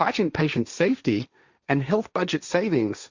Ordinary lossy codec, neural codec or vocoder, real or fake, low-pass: Opus, 64 kbps; codec, 16 kHz, 1.1 kbps, Voila-Tokenizer; fake; 7.2 kHz